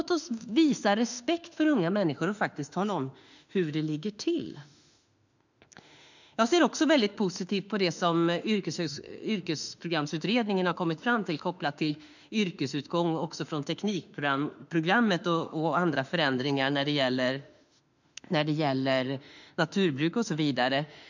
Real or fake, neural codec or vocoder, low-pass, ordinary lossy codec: fake; autoencoder, 48 kHz, 32 numbers a frame, DAC-VAE, trained on Japanese speech; 7.2 kHz; none